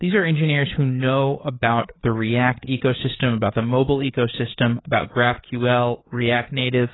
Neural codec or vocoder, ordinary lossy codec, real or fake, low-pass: codec, 16 kHz, 4 kbps, FreqCodec, larger model; AAC, 16 kbps; fake; 7.2 kHz